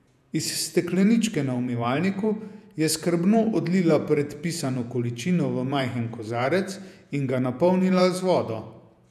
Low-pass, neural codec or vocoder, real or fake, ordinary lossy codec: 14.4 kHz; vocoder, 48 kHz, 128 mel bands, Vocos; fake; none